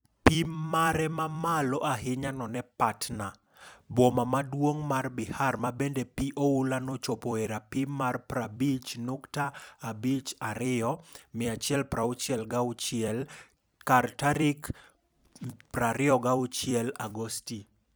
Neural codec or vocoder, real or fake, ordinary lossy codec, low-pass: vocoder, 44.1 kHz, 128 mel bands every 256 samples, BigVGAN v2; fake; none; none